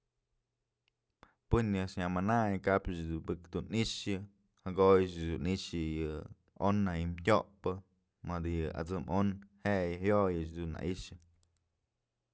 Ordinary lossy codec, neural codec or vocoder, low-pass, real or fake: none; none; none; real